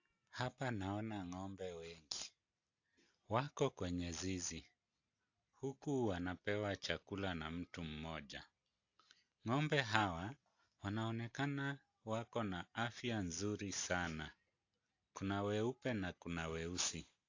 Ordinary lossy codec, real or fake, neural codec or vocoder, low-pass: AAC, 48 kbps; real; none; 7.2 kHz